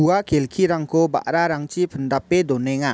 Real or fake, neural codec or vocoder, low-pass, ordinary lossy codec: real; none; none; none